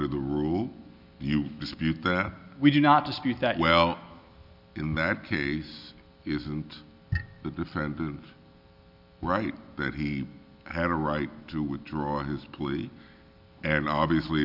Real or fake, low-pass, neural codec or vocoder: real; 5.4 kHz; none